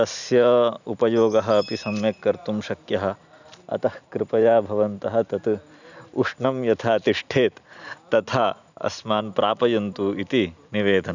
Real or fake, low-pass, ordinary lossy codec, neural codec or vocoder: real; 7.2 kHz; none; none